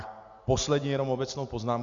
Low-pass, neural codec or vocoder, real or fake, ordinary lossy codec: 7.2 kHz; none; real; Opus, 64 kbps